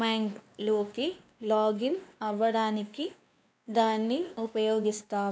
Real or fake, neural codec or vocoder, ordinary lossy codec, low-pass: fake; codec, 16 kHz, 2 kbps, X-Codec, WavLM features, trained on Multilingual LibriSpeech; none; none